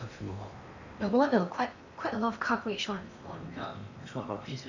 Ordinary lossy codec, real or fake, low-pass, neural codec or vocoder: none; fake; 7.2 kHz; codec, 16 kHz in and 24 kHz out, 0.8 kbps, FocalCodec, streaming, 65536 codes